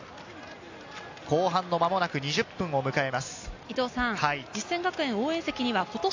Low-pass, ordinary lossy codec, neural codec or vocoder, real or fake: 7.2 kHz; AAC, 48 kbps; none; real